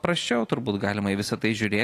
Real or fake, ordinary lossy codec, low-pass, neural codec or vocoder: real; AAC, 48 kbps; 14.4 kHz; none